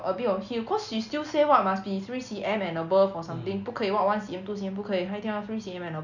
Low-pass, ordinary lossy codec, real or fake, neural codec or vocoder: 7.2 kHz; none; real; none